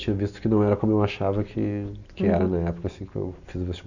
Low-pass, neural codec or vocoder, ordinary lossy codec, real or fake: 7.2 kHz; none; none; real